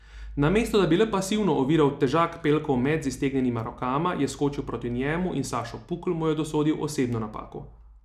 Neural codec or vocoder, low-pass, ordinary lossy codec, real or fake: none; 14.4 kHz; none; real